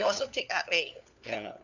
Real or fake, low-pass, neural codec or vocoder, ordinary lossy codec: fake; 7.2 kHz; codec, 16 kHz, 4 kbps, FunCodec, trained on LibriTTS, 50 frames a second; none